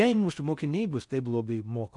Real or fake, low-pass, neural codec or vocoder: fake; 10.8 kHz; codec, 16 kHz in and 24 kHz out, 0.6 kbps, FocalCodec, streaming, 4096 codes